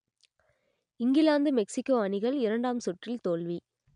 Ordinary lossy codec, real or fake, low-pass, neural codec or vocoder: MP3, 96 kbps; real; 9.9 kHz; none